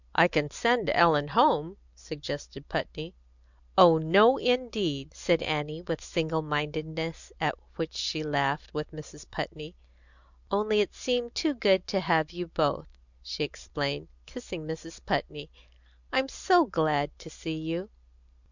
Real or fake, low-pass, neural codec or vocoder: real; 7.2 kHz; none